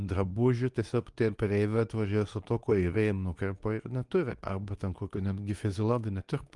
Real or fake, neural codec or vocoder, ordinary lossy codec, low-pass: fake; codec, 24 kHz, 0.9 kbps, WavTokenizer, medium speech release version 2; Opus, 32 kbps; 10.8 kHz